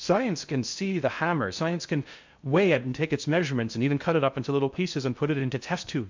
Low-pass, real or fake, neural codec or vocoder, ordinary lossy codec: 7.2 kHz; fake; codec, 16 kHz in and 24 kHz out, 0.6 kbps, FocalCodec, streaming, 2048 codes; MP3, 64 kbps